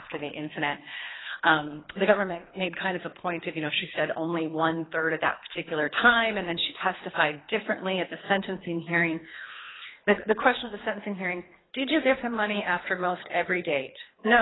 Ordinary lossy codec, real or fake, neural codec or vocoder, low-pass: AAC, 16 kbps; fake; codec, 24 kHz, 3 kbps, HILCodec; 7.2 kHz